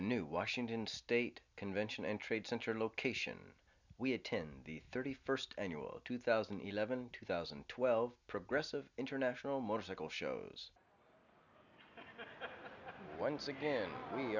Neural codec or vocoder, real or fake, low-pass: none; real; 7.2 kHz